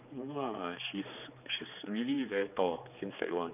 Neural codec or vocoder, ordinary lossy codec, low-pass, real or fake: codec, 16 kHz, 4 kbps, X-Codec, HuBERT features, trained on general audio; none; 3.6 kHz; fake